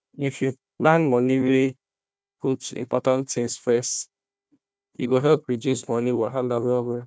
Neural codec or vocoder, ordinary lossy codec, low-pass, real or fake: codec, 16 kHz, 1 kbps, FunCodec, trained on Chinese and English, 50 frames a second; none; none; fake